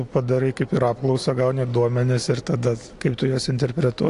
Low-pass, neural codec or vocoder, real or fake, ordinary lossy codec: 10.8 kHz; none; real; AAC, 48 kbps